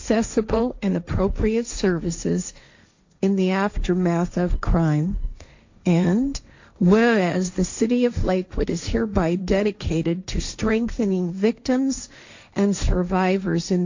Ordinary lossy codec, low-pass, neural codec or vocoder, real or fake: AAC, 48 kbps; 7.2 kHz; codec, 16 kHz, 1.1 kbps, Voila-Tokenizer; fake